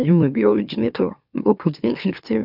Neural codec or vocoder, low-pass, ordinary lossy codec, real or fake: autoencoder, 44.1 kHz, a latent of 192 numbers a frame, MeloTTS; 5.4 kHz; none; fake